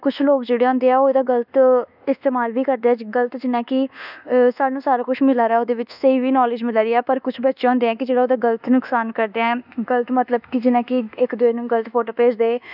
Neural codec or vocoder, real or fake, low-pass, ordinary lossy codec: codec, 24 kHz, 1.2 kbps, DualCodec; fake; 5.4 kHz; none